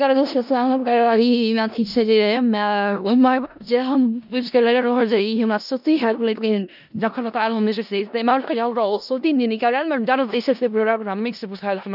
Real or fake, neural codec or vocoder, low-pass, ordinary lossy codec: fake; codec, 16 kHz in and 24 kHz out, 0.4 kbps, LongCat-Audio-Codec, four codebook decoder; 5.4 kHz; none